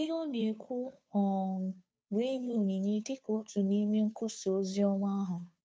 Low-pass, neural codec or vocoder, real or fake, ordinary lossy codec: none; codec, 16 kHz, 2 kbps, FunCodec, trained on Chinese and English, 25 frames a second; fake; none